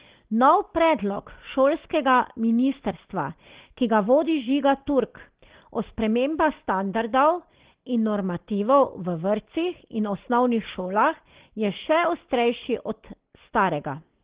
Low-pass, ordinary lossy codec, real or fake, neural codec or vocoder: 3.6 kHz; Opus, 16 kbps; real; none